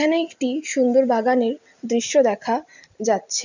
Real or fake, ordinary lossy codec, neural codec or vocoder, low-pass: real; none; none; 7.2 kHz